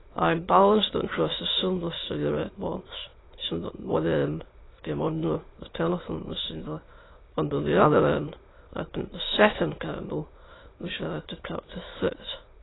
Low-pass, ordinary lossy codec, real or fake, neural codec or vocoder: 7.2 kHz; AAC, 16 kbps; fake; autoencoder, 22.05 kHz, a latent of 192 numbers a frame, VITS, trained on many speakers